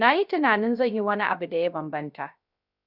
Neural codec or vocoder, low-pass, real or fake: codec, 16 kHz, 0.3 kbps, FocalCodec; 5.4 kHz; fake